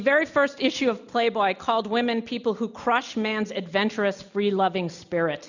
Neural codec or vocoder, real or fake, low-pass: none; real; 7.2 kHz